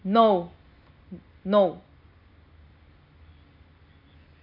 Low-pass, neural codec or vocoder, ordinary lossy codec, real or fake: 5.4 kHz; none; none; real